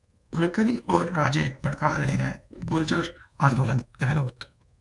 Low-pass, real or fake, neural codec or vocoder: 10.8 kHz; fake; codec, 24 kHz, 1.2 kbps, DualCodec